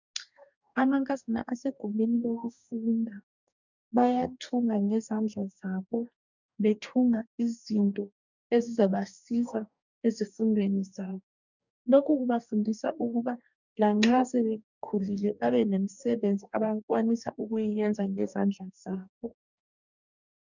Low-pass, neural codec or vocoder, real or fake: 7.2 kHz; codec, 44.1 kHz, 2.6 kbps, DAC; fake